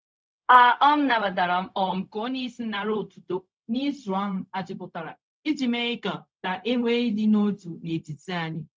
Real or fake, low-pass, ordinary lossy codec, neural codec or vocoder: fake; none; none; codec, 16 kHz, 0.4 kbps, LongCat-Audio-Codec